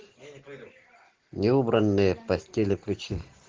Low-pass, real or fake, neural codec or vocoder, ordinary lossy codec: 7.2 kHz; real; none; Opus, 16 kbps